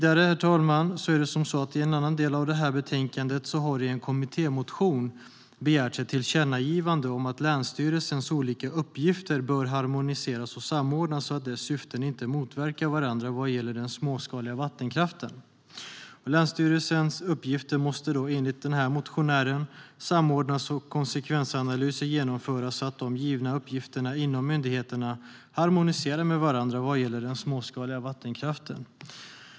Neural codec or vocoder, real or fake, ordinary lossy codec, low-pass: none; real; none; none